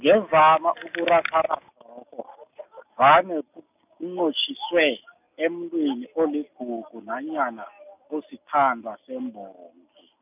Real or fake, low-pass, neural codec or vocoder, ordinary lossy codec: real; 3.6 kHz; none; none